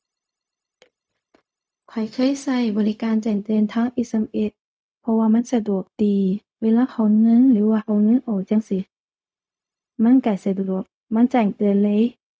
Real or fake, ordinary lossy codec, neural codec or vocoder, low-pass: fake; none; codec, 16 kHz, 0.4 kbps, LongCat-Audio-Codec; none